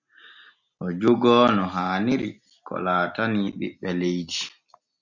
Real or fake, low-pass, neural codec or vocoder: real; 7.2 kHz; none